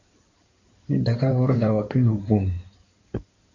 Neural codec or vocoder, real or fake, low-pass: codec, 16 kHz in and 24 kHz out, 2.2 kbps, FireRedTTS-2 codec; fake; 7.2 kHz